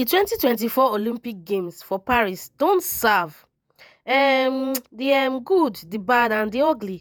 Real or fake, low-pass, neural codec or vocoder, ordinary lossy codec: fake; none; vocoder, 48 kHz, 128 mel bands, Vocos; none